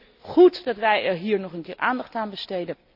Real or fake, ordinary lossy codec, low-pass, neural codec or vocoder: real; none; 5.4 kHz; none